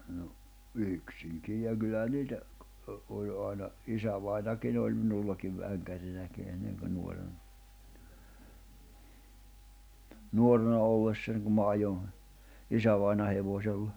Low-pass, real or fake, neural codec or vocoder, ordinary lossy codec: none; real; none; none